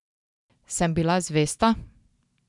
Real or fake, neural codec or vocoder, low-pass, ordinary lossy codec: real; none; 10.8 kHz; none